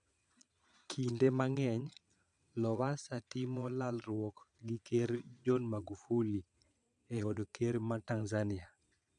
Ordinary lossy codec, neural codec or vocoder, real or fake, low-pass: none; vocoder, 22.05 kHz, 80 mel bands, Vocos; fake; 9.9 kHz